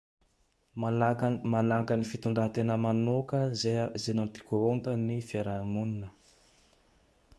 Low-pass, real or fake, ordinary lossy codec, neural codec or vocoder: none; fake; none; codec, 24 kHz, 0.9 kbps, WavTokenizer, medium speech release version 2